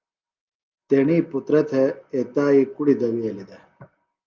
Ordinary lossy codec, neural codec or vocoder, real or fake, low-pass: Opus, 24 kbps; none; real; 7.2 kHz